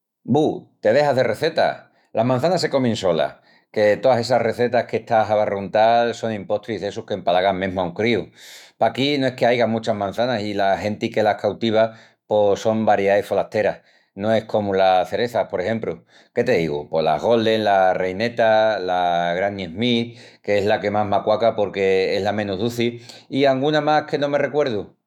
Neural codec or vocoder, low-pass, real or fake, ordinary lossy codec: autoencoder, 48 kHz, 128 numbers a frame, DAC-VAE, trained on Japanese speech; 19.8 kHz; fake; none